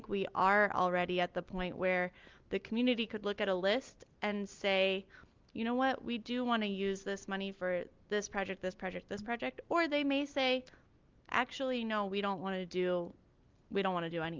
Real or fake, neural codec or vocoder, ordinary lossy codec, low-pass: real; none; Opus, 24 kbps; 7.2 kHz